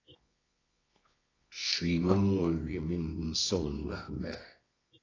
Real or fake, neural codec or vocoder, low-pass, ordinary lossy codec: fake; codec, 24 kHz, 0.9 kbps, WavTokenizer, medium music audio release; 7.2 kHz; AAC, 32 kbps